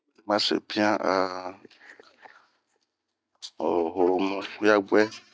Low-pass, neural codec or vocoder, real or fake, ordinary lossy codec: none; none; real; none